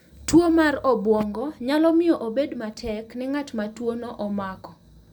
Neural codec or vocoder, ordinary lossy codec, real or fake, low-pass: none; none; real; 19.8 kHz